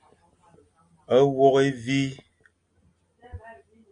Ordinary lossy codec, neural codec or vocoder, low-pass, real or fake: MP3, 48 kbps; none; 9.9 kHz; real